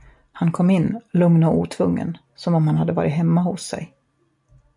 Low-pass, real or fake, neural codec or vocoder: 10.8 kHz; real; none